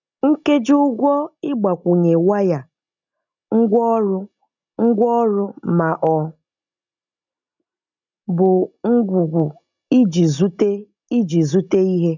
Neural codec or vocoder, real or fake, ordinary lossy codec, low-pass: none; real; none; 7.2 kHz